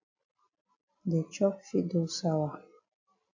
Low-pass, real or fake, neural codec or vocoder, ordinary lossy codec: 7.2 kHz; real; none; AAC, 48 kbps